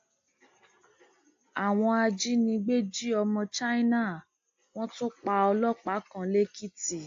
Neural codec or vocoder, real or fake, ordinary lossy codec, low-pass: none; real; AAC, 48 kbps; 7.2 kHz